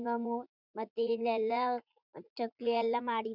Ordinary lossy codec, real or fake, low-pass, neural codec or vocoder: none; fake; 5.4 kHz; vocoder, 44.1 kHz, 80 mel bands, Vocos